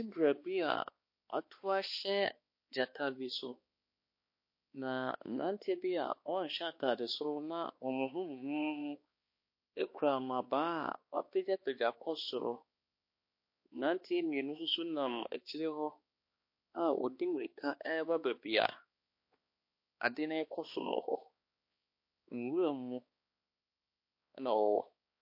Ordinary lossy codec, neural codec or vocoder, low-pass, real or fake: MP3, 32 kbps; codec, 16 kHz, 2 kbps, X-Codec, HuBERT features, trained on balanced general audio; 5.4 kHz; fake